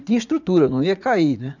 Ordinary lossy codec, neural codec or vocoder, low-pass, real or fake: none; vocoder, 44.1 kHz, 80 mel bands, Vocos; 7.2 kHz; fake